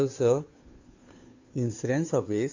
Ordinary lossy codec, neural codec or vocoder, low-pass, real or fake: AAC, 48 kbps; codec, 16 kHz, 2 kbps, FunCodec, trained on Chinese and English, 25 frames a second; 7.2 kHz; fake